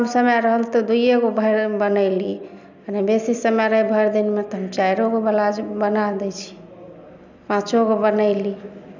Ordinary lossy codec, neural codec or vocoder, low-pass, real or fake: none; none; 7.2 kHz; real